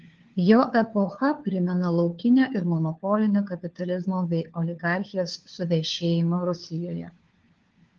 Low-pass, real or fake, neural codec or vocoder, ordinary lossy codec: 7.2 kHz; fake; codec, 16 kHz, 4 kbps, FunCodec, trained on LibriTTS, 50 frames a second; Opus, 16 kbps